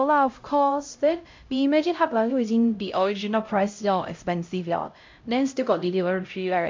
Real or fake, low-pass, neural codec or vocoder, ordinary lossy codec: fake; 7.2 kHz; codec, 16 kHz, 0.5 kbps, X-Codec, HuBERT features, trained on LibriSpeech; MP3, 48 kbps